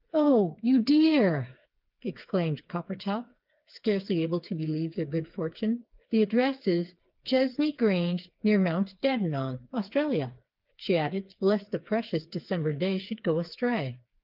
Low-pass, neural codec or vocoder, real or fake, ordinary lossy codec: 5.4 kHz; codec, 16 kHz, 4 kbps, FreqCodec, smaller model; fake; Opus, 24 kbps